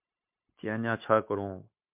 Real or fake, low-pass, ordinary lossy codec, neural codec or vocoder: fake; 3.6 kHz; MP3, 32 kbps; codec, 16 kHz, 0.9 kbps, LongCat-Audio-Codec